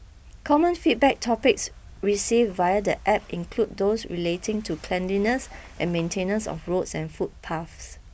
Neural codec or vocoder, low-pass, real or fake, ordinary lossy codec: none; none; real; none